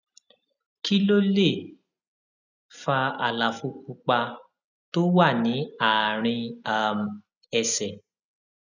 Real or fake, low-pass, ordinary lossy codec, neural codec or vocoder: real; 7.2 kHz; none; none